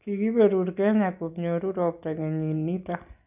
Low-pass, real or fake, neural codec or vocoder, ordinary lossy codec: 3.6 kHz; real; none; none